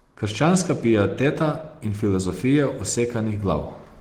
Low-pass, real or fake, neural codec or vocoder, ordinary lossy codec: 19.8 kHz; real; none; Opus, 16 kbps